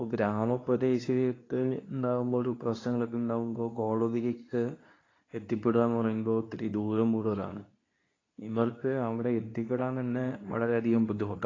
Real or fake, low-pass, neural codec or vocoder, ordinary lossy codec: fake; 7.2 kHz; codec, 24 kHz, 0.9 kbps, WavTokenizer, medium speech release version 2; AAC, 32 kbps